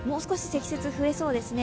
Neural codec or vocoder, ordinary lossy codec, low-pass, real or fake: none; none; none; real